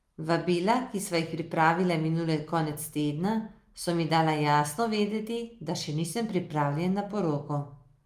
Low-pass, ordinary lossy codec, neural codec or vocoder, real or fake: 14.4 kHz; Opus, 32 kbps; none; real